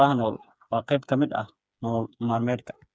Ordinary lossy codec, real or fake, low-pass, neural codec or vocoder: none; fake; none; codec, 16 kHz, 4 kbps, FreqCodec, smaller model